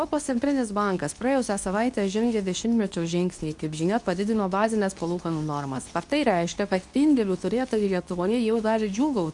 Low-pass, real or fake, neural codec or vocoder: 10.8 kHz; fake; codec, 24 kHz, 0.9 kbps, WavTokenizer, medium speech release version 2